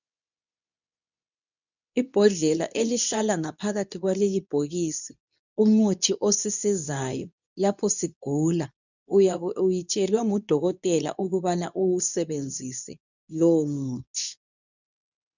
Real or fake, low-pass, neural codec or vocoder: fake; 7.2 kHz; codec, 24 kHz, 0.9 kbps, WavTokenizer, medium speech release version 2